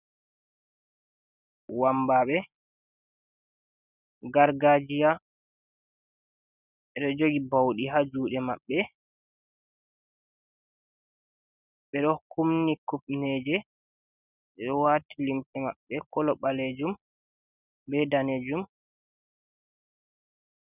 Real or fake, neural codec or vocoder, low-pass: real; none; 3.6 kHz